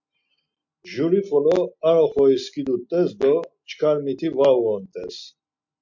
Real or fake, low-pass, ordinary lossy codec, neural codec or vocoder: real; 7.2 kHz; MP3, 48 kbps; none